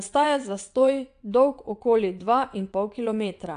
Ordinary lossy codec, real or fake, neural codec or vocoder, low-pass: AAC, 64 kbps; fake; vocoder, 22.05 kHz, 80 mel bands, WaveNeXt; 9.9 kHz